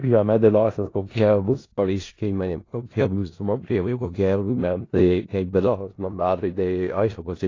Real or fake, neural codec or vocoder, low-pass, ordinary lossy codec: fake; codec, 16 kHz in and 24 kHz out, 0.4 kbps, LongCat-Audio-Codec, four codebook decoder; 7.2 kHz; AAC, 32 kbps